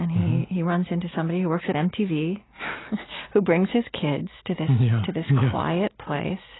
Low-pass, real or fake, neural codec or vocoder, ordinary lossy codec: 7.2 kHz; real; none; AAC, 16 kbps